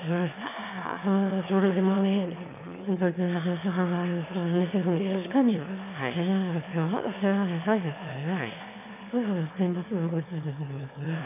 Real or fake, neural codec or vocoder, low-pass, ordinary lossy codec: fake; autoencoder, 22.05 kHz, a latent of 192 numbers a frame, VITS, trained on one speaker; 3.6 kHz; none